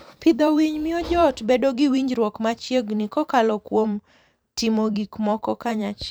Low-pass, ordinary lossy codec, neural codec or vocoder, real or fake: none; none; vocoder, 44.1 kHz, 128 mel bands every 512 samples, BigVGAN v2; fake